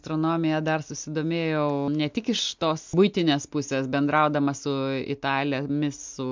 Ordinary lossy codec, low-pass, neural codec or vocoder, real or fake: MP3, 64 kbps; 7.2 kHz; none; real